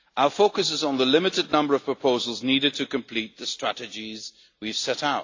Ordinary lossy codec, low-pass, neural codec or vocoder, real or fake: AAC, 48 kbps; 7.2 kHz; none; real